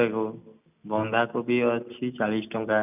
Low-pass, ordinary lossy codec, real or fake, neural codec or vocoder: 3.6 kHz; none; real; none